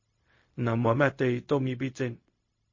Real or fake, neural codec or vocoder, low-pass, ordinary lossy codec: fake; codec, 16 kHz, 0.4 kbps, LongCat-Audio-Codec; 7.2 kHz; MP3, 32 kbps